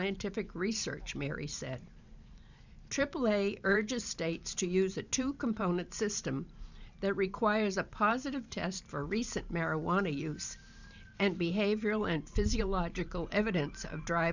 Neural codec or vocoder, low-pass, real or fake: vocoder, 44.1 kHz, 128 mel bands every 512 samples, BigVGAN v2; 7.2 kHz; fake